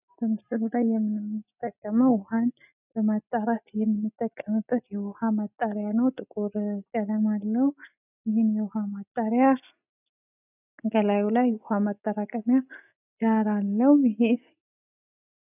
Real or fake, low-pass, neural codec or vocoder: real; 3.6 kHz; none